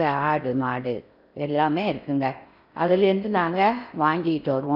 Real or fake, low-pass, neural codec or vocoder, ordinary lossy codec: fake; 5.4 kHz; codec, 16 kHz in and 24 kHz out, 0.6 kbps, FocalCodec, streaming, 4096 codes; none